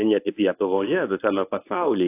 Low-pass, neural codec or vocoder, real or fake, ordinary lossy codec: 3.6 kHz; codec, 24 kHz, 0.9 kbps, WavTokenizer, medium speech release version 1; fake; AAC, 24 kbps